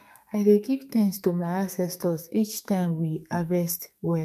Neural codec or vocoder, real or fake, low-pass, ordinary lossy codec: codec, 44.1 kHz, 2.6 kbps, SNAC; fake; 14.4 kHz; MP3, 96 kbps